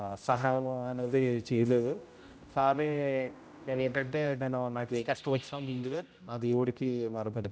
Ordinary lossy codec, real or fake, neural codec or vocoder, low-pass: none; fake; codec, 16 kHz, 0.5 kbps, X-Codec, HuBERT features, trained on general audio; none